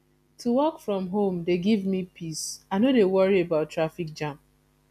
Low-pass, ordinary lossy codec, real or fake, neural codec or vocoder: 14.4 kHz; none; real; none